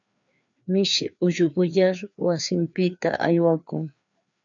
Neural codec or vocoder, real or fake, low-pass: codec, 16 kHz, 2 kbps, FreqCodec, larger model; fake; 7.2 kHz